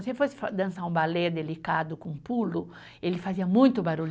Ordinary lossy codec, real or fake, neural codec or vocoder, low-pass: none; real; none; none